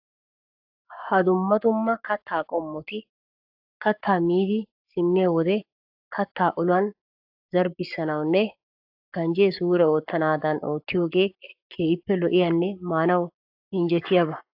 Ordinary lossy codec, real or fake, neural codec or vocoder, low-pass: AAC, 48 kbps; fake; codec, 44.1 kHz, 7.8 kbps, Pupu-Codec; 5.4 kHz